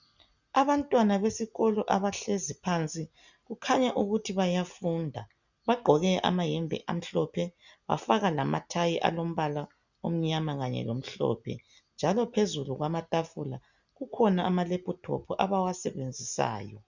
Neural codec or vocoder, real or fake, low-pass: none; real; 7.2 kHz